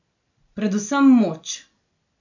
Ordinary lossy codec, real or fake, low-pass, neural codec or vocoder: none; real; 7.2 kHz; none